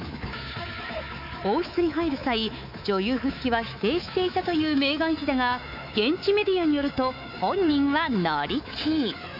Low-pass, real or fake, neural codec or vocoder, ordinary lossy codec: 5.4 kHz; fake; codec, 24 kHz, 3.1 kbps, DualCodec; none